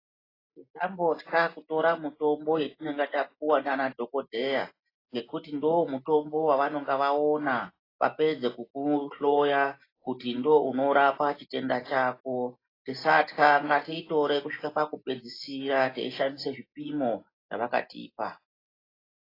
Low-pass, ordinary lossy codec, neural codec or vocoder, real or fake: 5.4 kHz; AAC, 24 kbps; none; real